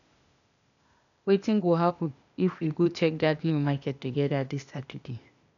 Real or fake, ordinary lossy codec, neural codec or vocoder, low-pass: fake; none; codec, 16 kHz, 0.8 kbps, ZipCodec; 7.2 kHz